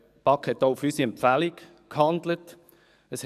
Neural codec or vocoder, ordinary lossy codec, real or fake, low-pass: codec, 44.1 kHz, 7.8 kbps, DAC; none; fake; 14.4 kHz